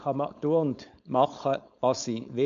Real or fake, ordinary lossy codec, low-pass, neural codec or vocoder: fake; MP3, 64 kbps; 7.2 kHz; codec, 16 kHz, 4.8 kbps, FACodec